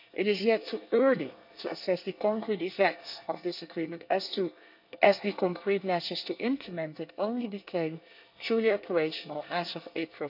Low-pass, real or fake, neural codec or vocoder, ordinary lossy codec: 5.4 kHz; fake; codec, 24 kHz, 1 kbps, SNAC; none